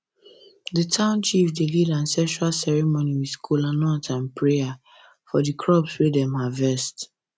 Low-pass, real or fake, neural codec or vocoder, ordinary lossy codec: none; real; none; none